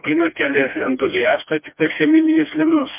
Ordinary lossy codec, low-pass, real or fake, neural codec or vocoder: MP3, 32 kbps; 3.6 kHz; fake; codec, 16 kHz, 1 kbps, FreqCodec, smaller model